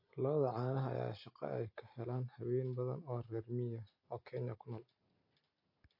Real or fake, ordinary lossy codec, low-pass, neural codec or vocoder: real; MP3, 32 kbps; 5.4 kHz; none